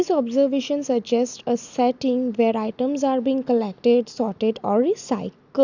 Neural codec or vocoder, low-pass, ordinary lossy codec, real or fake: none; 7.2 kHz; none; real